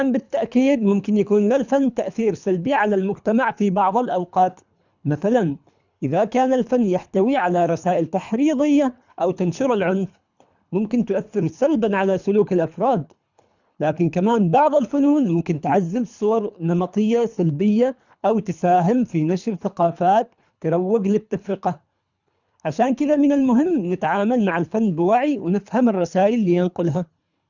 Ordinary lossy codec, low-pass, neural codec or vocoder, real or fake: none; 7.2 kHz; codec, 24 kHz, 3 kbps, HILCodec; fake